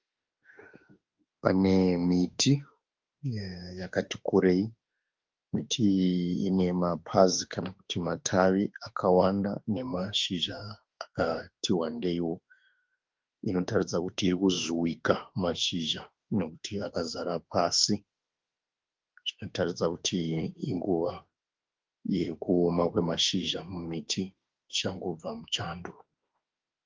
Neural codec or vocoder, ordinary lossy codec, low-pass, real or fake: autoencoder, 48 kHz, 32 numbers a frame, DAC-VAE, trained on Japanese speech; Opus, 24 kbps; 7.2 kHz; fake